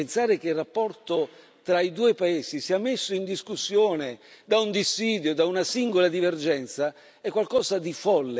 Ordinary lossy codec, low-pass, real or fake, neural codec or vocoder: none; none; real; none